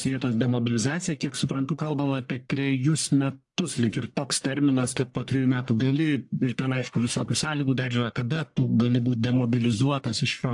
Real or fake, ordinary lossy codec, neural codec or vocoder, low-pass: fake; AAC, 64 kbps; codec, 44.1 kHz, 1.7 kbps, Pupu-Codec; 10.8 kHz